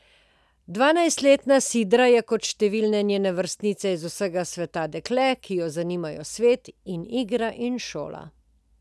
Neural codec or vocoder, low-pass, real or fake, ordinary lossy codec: none; none; real; none